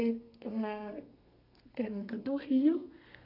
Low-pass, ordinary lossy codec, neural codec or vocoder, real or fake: 5.4 kHz; none; codec, 32 kHz, 1.9 kbps, SNAC; fake